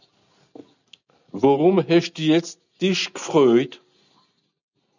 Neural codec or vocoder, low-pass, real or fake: none; 7.2 kHz; real